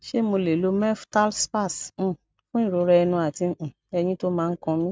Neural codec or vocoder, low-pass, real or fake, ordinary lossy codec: none; none; real; none